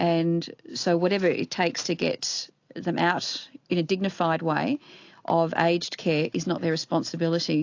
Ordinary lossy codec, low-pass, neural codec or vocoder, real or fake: AAC, 48 kbps; 7.2 kHz; none; real